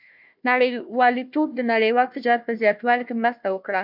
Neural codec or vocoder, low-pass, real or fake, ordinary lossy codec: codec, 16 kHz, 1 kbps, FunCodec, trained on Chinese and English, 50 frames a second; 5.4 kHz; fake; MP3, 48 kbps